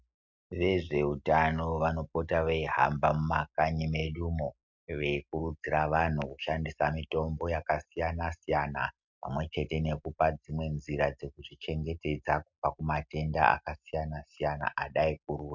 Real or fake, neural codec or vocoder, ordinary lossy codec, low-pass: real; none; MP3, 64 kbps; 7.2 kHz